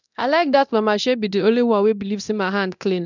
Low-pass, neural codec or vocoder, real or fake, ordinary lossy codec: 7.2 kHz; codec, 24 kHz, 0.9 kbps, DualCodec; fake; none